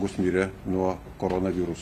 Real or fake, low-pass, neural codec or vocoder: real; 14.4 kHz; none